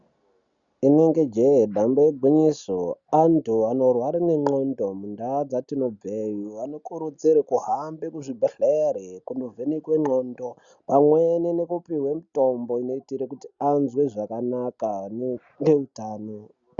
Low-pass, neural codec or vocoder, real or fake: 7.2 kHz; none; real